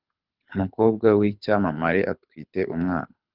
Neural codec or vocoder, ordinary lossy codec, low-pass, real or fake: codec, 24 kHz, 6 kbps, HILCodec; Opus, 24 kbps; 5.4 kHz; fake